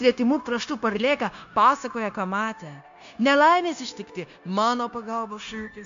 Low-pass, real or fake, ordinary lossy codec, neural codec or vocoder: 7.2 kHz; fake; MP3, 64 kbps; codec, 16 kHz, 0.9 kbps, LongCat-Audio-Codec